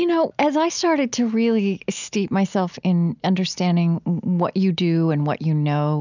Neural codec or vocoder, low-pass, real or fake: none; 7.2 kHz; real